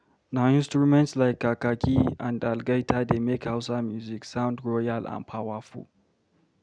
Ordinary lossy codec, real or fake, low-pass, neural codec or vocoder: none; real; 9.9 kHz; none